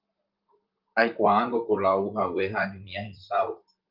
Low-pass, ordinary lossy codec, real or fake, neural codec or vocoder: 5.4 kHz; Opus, 32 kbps; fake; vocoder, 44.1 kHz, 128 mel bands, Pupu-Vocoder